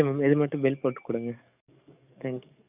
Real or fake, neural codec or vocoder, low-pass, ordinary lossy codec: fake; codec, 44.1 kHz, 7.8 kbps, DAC; 3.6 kHz; none